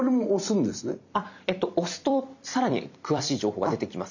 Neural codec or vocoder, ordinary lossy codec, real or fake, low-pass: vocoder, 44.1 kHz, 128 mel bands every 256 samples, BigVGAN v2; none; fake; 7.2 kHz